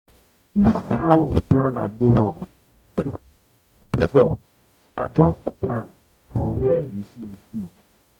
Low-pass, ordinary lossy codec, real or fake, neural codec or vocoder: 19.8 kHz; none; fake; codec, 44.1 kHz, 0.9 kbps, DAC